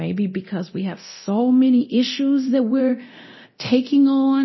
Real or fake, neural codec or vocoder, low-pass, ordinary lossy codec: fake; codec, 24 kHz, 0.9 kbps, DualCodec; 7.2 kHz; MP3, 24 kbps